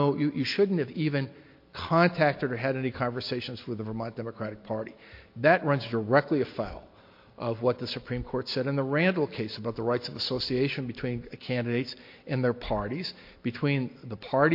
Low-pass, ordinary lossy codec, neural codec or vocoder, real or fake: 5.4 kHz; MP3, 32 kbps; none; real